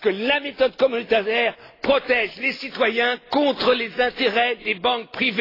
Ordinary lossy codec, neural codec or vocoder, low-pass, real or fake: AAC, 24 kbps; none; 5.4 kHz; real